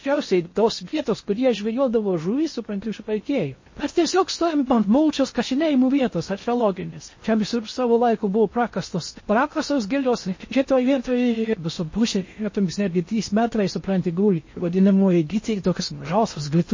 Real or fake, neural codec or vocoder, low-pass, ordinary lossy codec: fake; codec, 16 kHz in and 24 kHz out, 0.6 kbps, FocalCodec, streaming, 4096 codes; 7.2 kHz; MP3, 32 kbps